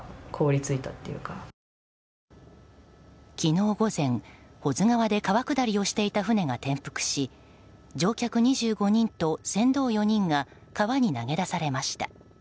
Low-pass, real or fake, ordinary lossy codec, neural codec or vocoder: none; real; none; none